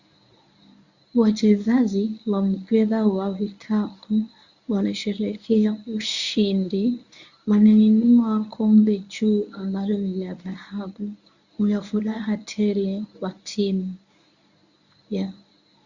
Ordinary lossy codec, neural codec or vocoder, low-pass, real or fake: Opus, 64 kbps; codec, 24 kHz, 0.9 kbps, WavTokenizer, medium speech release version 1; 7.2 kHz; fake